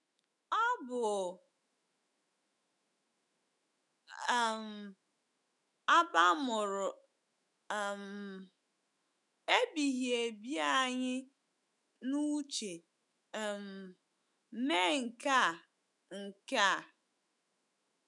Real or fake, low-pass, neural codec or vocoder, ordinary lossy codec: fake; 10.8 kHz; autoencoder, 48 kHz, 128 numbers a frame, DAC-VAE, trained on Japanese speech; none